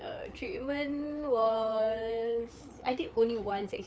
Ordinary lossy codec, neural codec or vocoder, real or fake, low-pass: none; codec, 16 kHz, 4 kbps, FreqCodec, larger model; fake; none